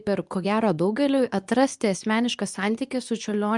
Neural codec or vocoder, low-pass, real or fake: codec, 24 kHz, 0.9 kbps, WavTokenizer, medium speech release version 2; 10.8 kHz; fake